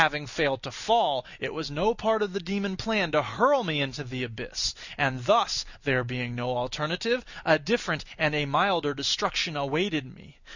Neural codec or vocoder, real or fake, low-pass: none; real; 7.2 kHz